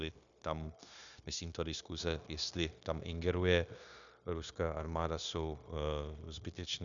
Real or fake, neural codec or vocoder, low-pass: fake; codec, 16 kHz, 0.9 kbps, LongCat-Audio-Codec; 7.2 kHz